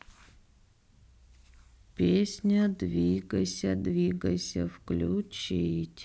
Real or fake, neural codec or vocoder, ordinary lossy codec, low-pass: real; none; none; none